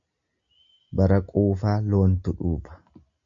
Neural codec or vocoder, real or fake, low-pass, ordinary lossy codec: none; real; 7.2 kHz; AAC, 64 kbps